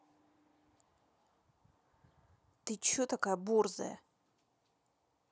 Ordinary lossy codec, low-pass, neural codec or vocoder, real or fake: none; none; none; real